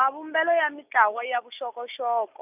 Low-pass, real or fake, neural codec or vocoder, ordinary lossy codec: 3.6 kHz; real; none; none